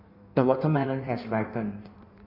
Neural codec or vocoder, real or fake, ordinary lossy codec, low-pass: codec, 16 kHz in and 24 kHz out, 1.1 kbps, FireRedTTS-2 codec; fake; none; 5.4 kHz